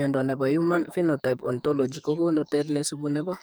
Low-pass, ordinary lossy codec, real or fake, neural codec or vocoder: none; none; fake; codec, 44.1 kHz, 2.6 kbps, SNAC